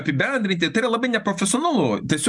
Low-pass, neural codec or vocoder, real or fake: 10.8 kHz; none; real